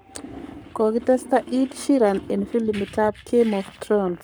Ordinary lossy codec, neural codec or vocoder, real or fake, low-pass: none; codec, 44.1 kHz, 7.8 kbps, Pupu-Codec; fake; none